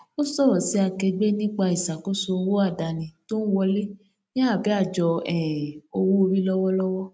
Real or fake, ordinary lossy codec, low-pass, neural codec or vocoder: real; none; none; none